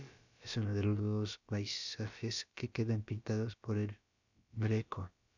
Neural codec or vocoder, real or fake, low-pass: codec, 16 kHz, about 1 kbps, DyCAST, with the encoder's durations; fake; 7.2 kHz